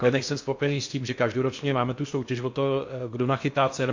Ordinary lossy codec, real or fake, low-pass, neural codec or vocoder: MP3, 48 kbps; fake; 7.2 kHz; codec, 16 kHz in and 24 kHz out, 0.8 kbps, FocalCodec, streaming, 65536 codes